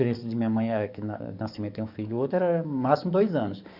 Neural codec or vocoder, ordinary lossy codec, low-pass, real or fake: codec, 44.1 kHz, 7.8 kbps, DAC; none; 5.4 kHz; fake